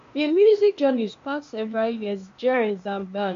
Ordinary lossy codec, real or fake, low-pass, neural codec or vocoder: MP3, 48 kbps; fake; 7.2 kHz; codec, 16 kHz, 0.8 kbps, ZipCodec